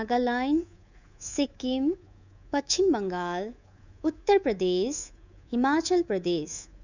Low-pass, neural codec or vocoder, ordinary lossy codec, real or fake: 7.2 kHz; codec, 24 kHz, 3.1 kbps, DualCodec; none; fake